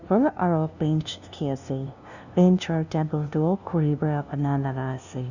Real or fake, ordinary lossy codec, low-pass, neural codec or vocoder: fake; none; 7.2 kHz; codec, 16 kHz, 0.5 kbps, FunCodec, trained on LibriTTS, 25 frames a second